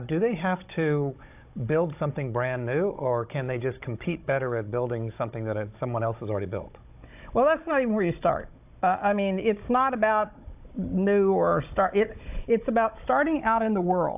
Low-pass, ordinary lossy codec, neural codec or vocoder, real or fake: 3.6 kHz; AAC, 32 kbps; codec, 16 kHz, 16 kbps, FunCodec, trained on Chinese and English, 50 frames a second; fake